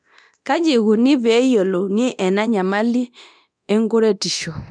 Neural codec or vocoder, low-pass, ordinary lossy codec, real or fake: codec, 24 kHz, 0.9 kbps, DualCodec; 9.9 kHz; none; fake